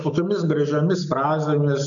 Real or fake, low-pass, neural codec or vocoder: real; 7.2 kHz; none